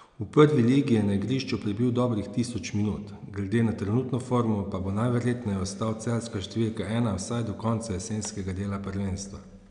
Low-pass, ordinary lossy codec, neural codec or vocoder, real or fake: 9.9 kHz; none; none; real